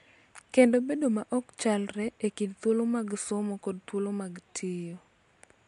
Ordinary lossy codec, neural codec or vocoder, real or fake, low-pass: MP3, 64 kbps; none; real; 10.8 kHz